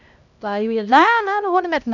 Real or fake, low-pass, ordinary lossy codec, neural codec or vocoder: fake; 7.2 kHz; none; codec, 16 kHz, 1 kbps, X-Codec, HuBERT features, trained on LibriSpeech